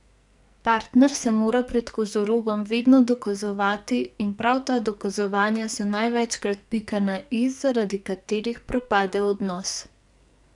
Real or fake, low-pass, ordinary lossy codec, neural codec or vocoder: fake; 10.8 kHz; none; codec, 44.1 kHz, 2.6 kbps, SNAC